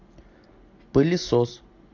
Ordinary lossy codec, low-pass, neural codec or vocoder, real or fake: AAC, 48 kbps; 7.2 kHz; none; real